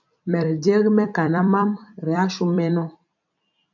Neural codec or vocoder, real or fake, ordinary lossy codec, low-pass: vocoder, 44.1 kHz, 128 mel bands every 256 samples, BigVGAN v2; fake; MP3, 64 kbps; 7.2 kHz